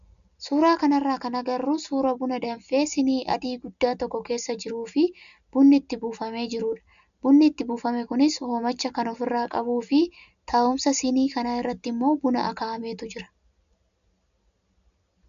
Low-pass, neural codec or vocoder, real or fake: 7.2 kHz; none; real